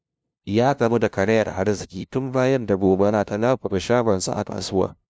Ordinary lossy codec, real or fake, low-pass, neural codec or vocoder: none; fake; none; codec, 16 kHz, 0.5 kbps, FunCodec, trained on LibriTTS, 25 frames a second